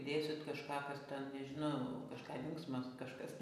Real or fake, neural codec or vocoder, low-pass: real; none; 14.4 kHz